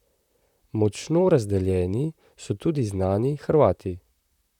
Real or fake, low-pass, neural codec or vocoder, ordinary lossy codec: fake; 19.8 kHz; vocoder, 44.1 kHz, 128 mel bands, Pupu-Vocoder; none